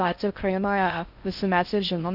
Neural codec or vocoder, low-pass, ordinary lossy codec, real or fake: codec, 16 kHz in and 24 kHz out, 0.6 kbps, FocalCodec, streaming, 4096 codes; 5.4 kHz; Opus, 64 kbps; fake